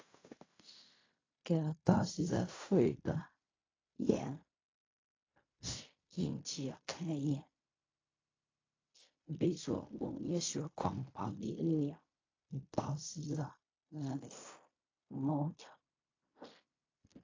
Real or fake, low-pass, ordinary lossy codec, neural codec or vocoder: fake; 7.2 kHz; AAC, 32 kbps; codec, 16 kHz in and 24 kHz out, 0.4 kbps, LongCat-Audio-Codec, fine tuned four codebook decoder